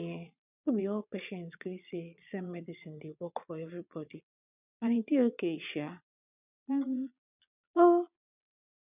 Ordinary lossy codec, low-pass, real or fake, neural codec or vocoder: none; 3.6 kHz; fake; vocoder, 22.05 kHz, 80 mel bands, Vocos